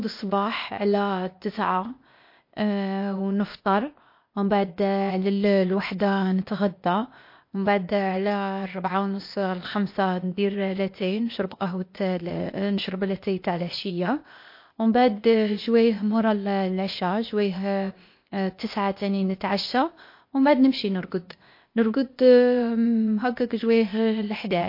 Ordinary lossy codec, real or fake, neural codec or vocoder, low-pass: MP3, 32 kbps; fake; codec, 16 kHz, 0.8 kbps, ZipCodec; 5.4 kHz